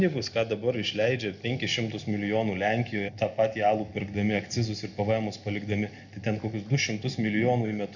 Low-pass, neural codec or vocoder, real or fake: 7.2 kHz; none; real